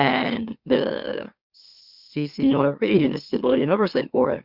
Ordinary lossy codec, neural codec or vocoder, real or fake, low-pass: Opus, 64 kbps; autoencoder, 44.1 kHz, a latent of 192 numbers a frame, MeloTTS; fake; 5.4 kHz